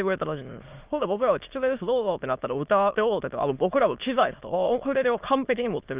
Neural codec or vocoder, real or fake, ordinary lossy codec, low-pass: autoencoder, 22.05 kHz, a latent of 192 numbers a frame, VITS, trained on many speakers; fake; Opus, 24 kbps; 3.6 kHz